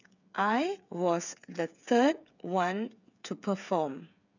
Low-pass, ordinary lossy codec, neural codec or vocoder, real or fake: 7.2 kHz; none; codec, 16 kHz, 8 kbps, FreqCodec, smaller model; fake